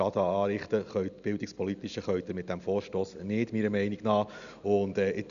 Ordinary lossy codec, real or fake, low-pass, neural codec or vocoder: none; real; 7.2 kHz; none